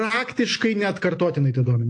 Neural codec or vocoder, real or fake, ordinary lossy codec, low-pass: none; real; AAC, 48 kbps; 9.9 kHz